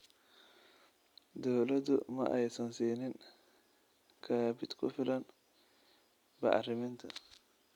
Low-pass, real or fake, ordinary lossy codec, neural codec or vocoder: 19.8 kHz; real; none; none